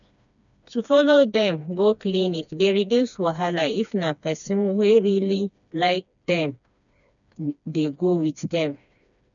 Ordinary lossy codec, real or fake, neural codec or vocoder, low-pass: none; fake; codec, 16 kHz, 2 kbps, FreqCodec, smaller model; 7.2 kHz